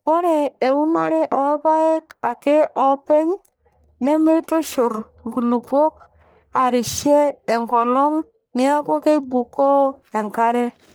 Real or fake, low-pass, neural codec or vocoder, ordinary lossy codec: fake; none; codec, 44.1 kHz, 1.7 kbps, Pupu-Codec; none